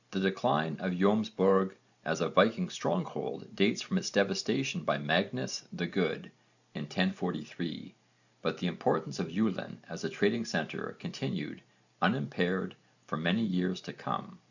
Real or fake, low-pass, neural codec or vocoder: real; 7.2 kHz; none